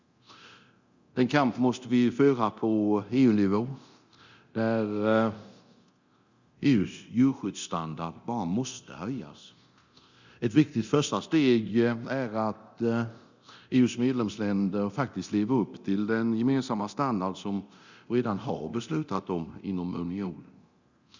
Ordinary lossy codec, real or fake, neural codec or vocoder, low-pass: Opus, 64 kbps; fake; codec, 24 kHz, 0.9 kbps, DualCodec; 7.2 kHz